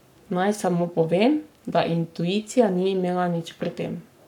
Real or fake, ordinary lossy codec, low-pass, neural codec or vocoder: fake; none; 19.8 kHz; codec, 44.1 kHz, 7.8 kbps, Pupu-Codec